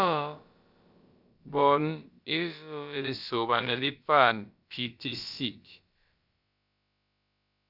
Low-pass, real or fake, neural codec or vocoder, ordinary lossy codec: 5.4 kHz; fake; codec, 16 kHz, about 1 kbps, DyCAST, with the encoder's durations; Opus, 64 kbps